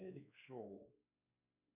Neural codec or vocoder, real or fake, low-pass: codec, 16 kHz, 2 kbps, X-Codec, WavLM features, trained on Multilingual LibriSpeech; fake; 3.6 kHz